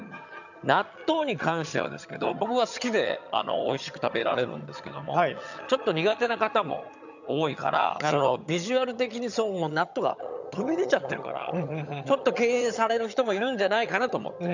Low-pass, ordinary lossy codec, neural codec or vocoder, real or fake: 7.2 kHz; none; vocoder, 22.05 kHz, 80 mel bands, HiFi-GAN; fake